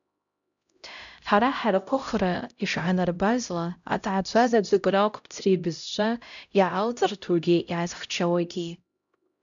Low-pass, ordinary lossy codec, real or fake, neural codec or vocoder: 7.2 kHz; MP3, 96 kbps; fake; codec, 16 kHz, 0.5 kbps, X-Codec, HuBERT features, trained on LibriSpeech